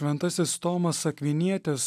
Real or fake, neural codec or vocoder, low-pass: real; none; 14.4 kHz